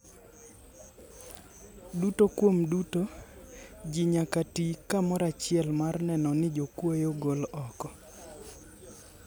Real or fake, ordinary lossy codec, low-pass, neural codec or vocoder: real; none; none; none